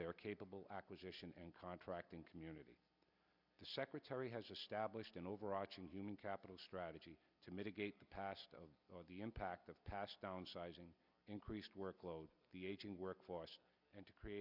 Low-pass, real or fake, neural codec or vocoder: 5.4 kHz; real; none